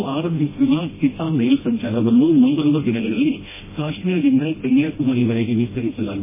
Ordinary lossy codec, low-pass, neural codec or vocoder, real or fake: MP3, 16 kbps; 3.6 kHz; codec, 16 kHz, 1 kbps, FreqCodec, smaller model; fake